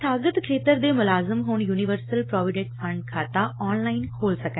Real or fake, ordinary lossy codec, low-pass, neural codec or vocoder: real; AAC, 16 kbps; 7.2 kHz; none